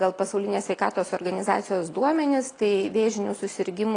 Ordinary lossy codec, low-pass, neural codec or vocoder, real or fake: AAC, 32 kbps; 9.9 kHz; none; real